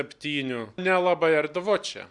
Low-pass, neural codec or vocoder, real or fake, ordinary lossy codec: 10.8 kHz; none; real; Opus, 64 kbps